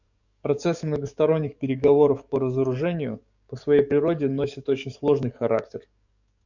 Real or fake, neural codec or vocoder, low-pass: fake; codec, 44.1 kHz, 7.8 kbps, DAC; 7.2 kHz